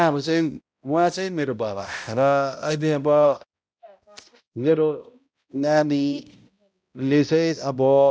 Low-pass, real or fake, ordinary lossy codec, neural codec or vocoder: none; fake; none; codec, 16 kHz, 0.5 kbps, X-Codec, HuBERT features, trained on balanced general audio